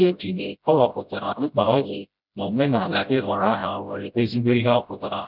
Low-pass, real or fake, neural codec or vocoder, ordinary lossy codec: 5.4 kHz; fake; codec, 16 kHz, 0.5 kbps, FreqCodec, smaller model; none